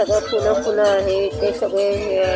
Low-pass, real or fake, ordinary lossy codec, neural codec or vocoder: none; real; none; none